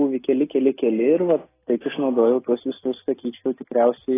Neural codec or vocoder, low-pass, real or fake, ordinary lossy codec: none; 3.6 kHz; real; AAC, 16 kbps